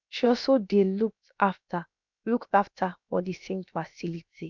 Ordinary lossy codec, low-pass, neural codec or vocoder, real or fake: none; 7.2 kHz; codec, 16 kHz, about 1 kbps, DyCAST, with the encoder's durations; fake